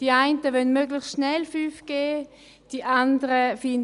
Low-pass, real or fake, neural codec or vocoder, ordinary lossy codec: 10.8 kHz; real; none; none